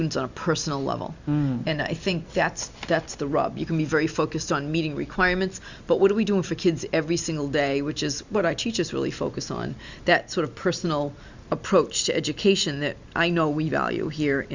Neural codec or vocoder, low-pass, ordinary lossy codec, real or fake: none; 7.2 kHz; Opus, 64 kbps; real